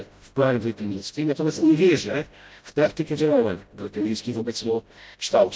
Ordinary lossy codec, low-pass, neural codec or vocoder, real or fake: none; none; codec, 16 kHz, 0.5 kbps, FreqCodec, smaller model; fake